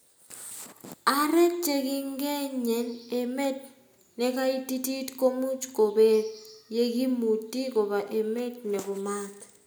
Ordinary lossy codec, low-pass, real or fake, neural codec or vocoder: none; none; real; none